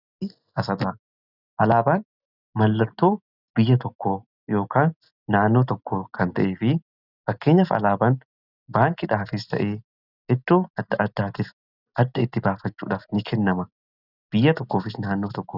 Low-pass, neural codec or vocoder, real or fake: 5.4 kHz; none; real